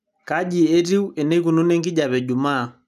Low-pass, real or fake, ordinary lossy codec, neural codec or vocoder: 14.4 kHz; real; none; none